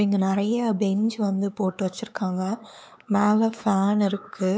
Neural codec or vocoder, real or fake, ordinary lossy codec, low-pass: codec, 16 kHz, 4 kbps, X-Codec, WavLM features, trained on Multilingual LibriSpeech; fake; none; none